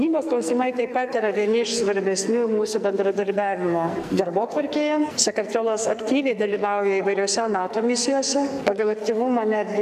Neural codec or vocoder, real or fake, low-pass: codec, 44.1 kHz, 2.6 kbps, SNAC; fake; 14.4 kHz